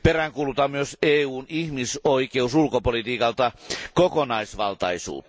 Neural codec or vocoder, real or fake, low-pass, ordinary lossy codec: none; real; none; none